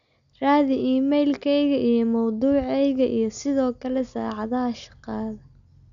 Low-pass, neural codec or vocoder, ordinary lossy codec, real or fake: 7.2 kHz; none; none; real